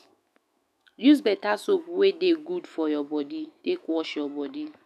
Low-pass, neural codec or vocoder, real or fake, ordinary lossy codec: 14.4 kHz; autoencoder, 48 kHz, 128 numbers a frame, DAC-VAE, trained on Japanese speech; fake; none